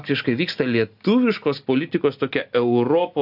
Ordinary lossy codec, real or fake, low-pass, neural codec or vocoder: AAC, 48 kbps; real; 5.4 kHz; none